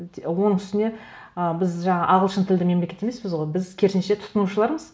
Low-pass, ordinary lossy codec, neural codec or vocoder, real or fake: none; none; none; real